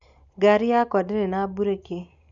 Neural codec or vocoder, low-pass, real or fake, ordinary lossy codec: none; 7.2 kHz; real; none